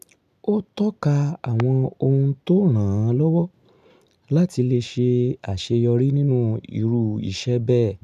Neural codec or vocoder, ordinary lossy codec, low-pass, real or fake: none; none; 14.4 kHz; real